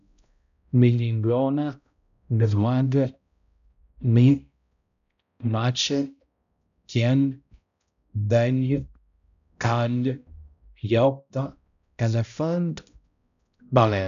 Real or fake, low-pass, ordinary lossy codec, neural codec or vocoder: fake; 7.2 kHz; none; codec, 16 kHz, 0.5 kbps, X-Codec, HuBERT features, trained on balanced general audio